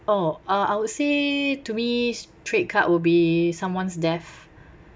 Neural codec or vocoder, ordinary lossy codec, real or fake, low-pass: none; none; real; none